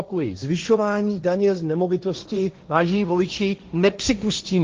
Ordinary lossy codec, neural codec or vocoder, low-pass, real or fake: Opus, 32 kbps; codec, 16 kHz, 1.1 kbps, Voila-Tokenizer; 7.2 kHz; fake